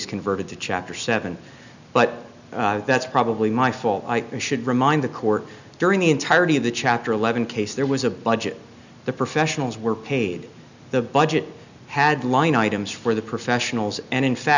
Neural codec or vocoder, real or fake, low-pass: none; real; 7.2 kHz